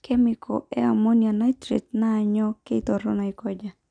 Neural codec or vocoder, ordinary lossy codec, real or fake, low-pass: none; none; real; 9.9 kHz